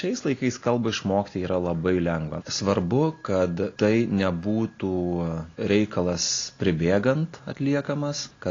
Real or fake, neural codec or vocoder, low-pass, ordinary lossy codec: real; none; 7.2 kHz; AAC, 32 kbps